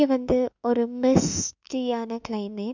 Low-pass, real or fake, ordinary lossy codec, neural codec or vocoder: 7.2 kHz; fake; none; autoencoder, 48 kHz, 32 numbers a frame, DAC-VAE, trained on Japanese speech